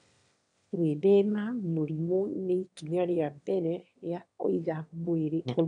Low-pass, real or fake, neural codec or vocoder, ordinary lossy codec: 9.9 kHz; fake; autoencoder, 22.05 kHz, a latent of 192 numbers a frame, VITS, trained on one speaker; none